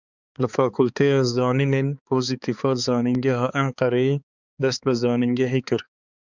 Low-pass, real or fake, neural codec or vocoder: 7.2 kHz; fake; codec, 16 kHz, 4 kbps, X-Codec, HuBERT features, trained on balanced general audio